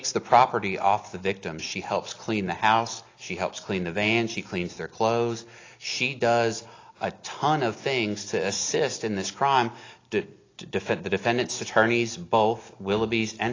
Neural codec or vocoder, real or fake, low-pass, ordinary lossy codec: none; real; 7.2 kHz; AAC, 32 kbps